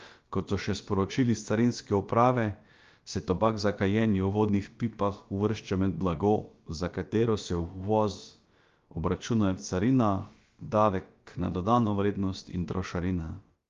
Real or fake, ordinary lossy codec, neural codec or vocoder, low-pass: fake; Opus, 32 kbps; codec, 16 kHz, about 1 kbps, DyCAST, with the encoder's durations; 7.2 kHz